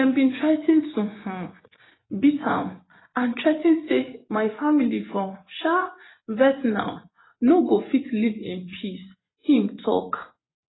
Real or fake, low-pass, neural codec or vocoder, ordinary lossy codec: fake; 7.2 kHz; vocoder, 24 kHz, 100 mel bands, Vocos; AAC, 16 kbps